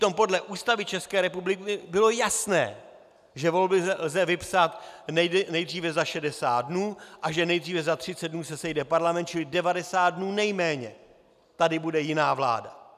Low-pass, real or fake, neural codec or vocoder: 14.4 kHz; real; none